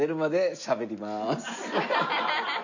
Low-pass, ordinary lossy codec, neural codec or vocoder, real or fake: 7.2 kHz; none; none; real